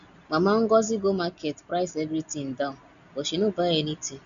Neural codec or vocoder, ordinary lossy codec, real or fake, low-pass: none; none; real; 7.2 kHz